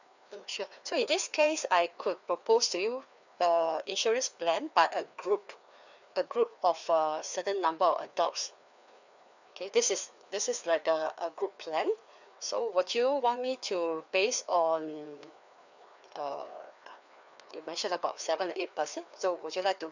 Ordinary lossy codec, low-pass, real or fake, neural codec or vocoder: none; 7.2 kHz; fake; codec, 16 kHz, 2 kbps, FreqCodec, larger model